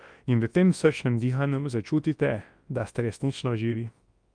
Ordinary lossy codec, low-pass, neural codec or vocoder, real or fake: Opus, 32 kbps; 9.9 kHz; codec, 24 kHz, 0.9 kbps, WavTokenizer, large speech release; fake